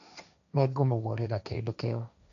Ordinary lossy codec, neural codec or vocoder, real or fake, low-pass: none; codec, 16 kHz, 1.1 kbps, Voila-Tokenizer; fake; 7.2 kHz